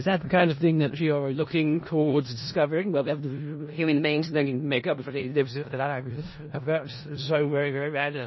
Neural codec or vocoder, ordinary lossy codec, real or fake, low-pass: codec, 16 kHz in and 24 kHz out, 0.4 kbps, LongCat-Audio-Codec, four codebook decoder; MP3, 24 kbps; fake; 7.2 kHz